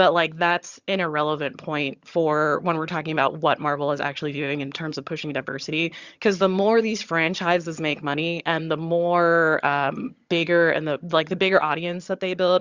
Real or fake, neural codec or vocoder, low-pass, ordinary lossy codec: fake; vocoder, 22.05 kHz, 80 mel bands, HiFi-GAN; 7.2 kHz; Opus, 64 kbps